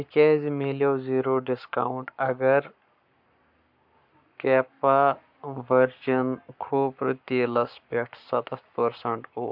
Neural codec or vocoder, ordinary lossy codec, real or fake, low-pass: codec, 44.1 kHz, 7.8 kbps, Pupu-Codec; MP3, 48 kbps; fake; 5.4 kHz